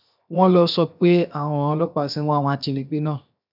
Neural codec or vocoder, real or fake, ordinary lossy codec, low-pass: codec, 16 kHz, 0.7 kbps, FocalCodec; fake; none; 5.4 kHz